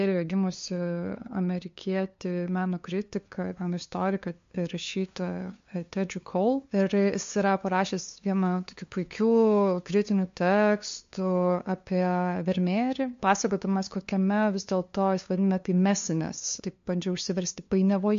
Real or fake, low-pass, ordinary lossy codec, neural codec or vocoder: fake; 7.2 kHz; MP3, 48 kbps; codec, 16 kHz, 2 kbps, FunCodec, trained on LibriTTS, 25 frames a second